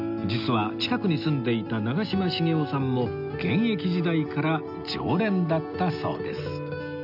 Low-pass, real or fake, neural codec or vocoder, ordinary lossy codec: 5.4 kHz; real; none; none